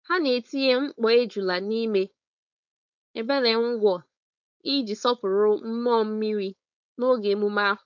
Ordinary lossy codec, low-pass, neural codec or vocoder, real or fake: none; 7.2 kHz; codec, 16 kHz, 4.8 kbps, FACodec; fake